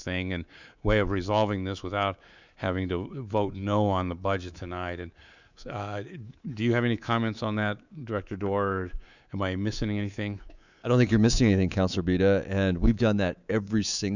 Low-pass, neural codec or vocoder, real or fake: 7.2 kHz; codec, 24 kHz, 3.1 kbps, DualCodec; fake